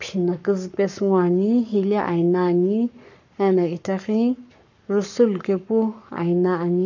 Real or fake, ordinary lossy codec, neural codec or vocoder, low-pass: fake; none; codec, 44.1 kHz, 7.8 kbps, Pupu-Codec; 7.2 kHz